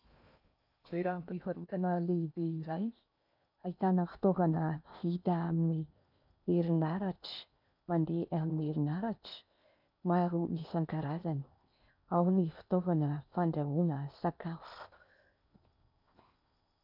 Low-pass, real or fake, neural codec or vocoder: 5.4 kHz; fake; codec, 16 kHz in and 24 kHz out, 0.8 kbps, FocalCodec, streaming, 65536 codes